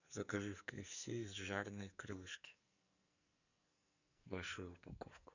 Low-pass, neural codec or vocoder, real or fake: 7.2 kHz; codec, 44.1 kHz, 2.6 kbps, SNAC; fake